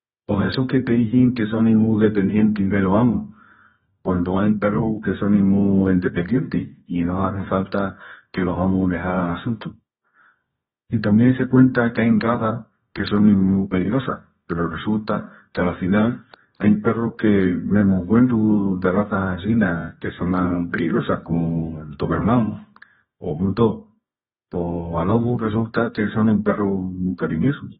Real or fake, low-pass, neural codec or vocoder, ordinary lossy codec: fake; 10.8 kHz; codec, 24 kHz, 0.9 kbps, WavTokenizer, medium music audio release; AAC, 16 kbps